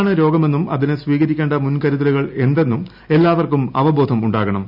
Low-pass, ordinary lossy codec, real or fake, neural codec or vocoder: 5.4 kHz; none; real; none